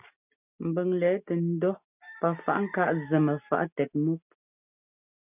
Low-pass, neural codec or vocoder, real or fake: 3.6 kHz; none; real